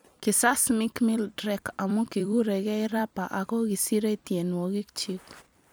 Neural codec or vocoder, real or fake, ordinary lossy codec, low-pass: vocoder, 44.1 kHz, 128 mel bands every 256 samples, BigVGAN v2; fake; none; none